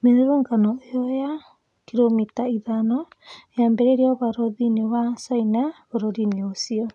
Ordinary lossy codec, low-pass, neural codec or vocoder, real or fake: none; none; none; real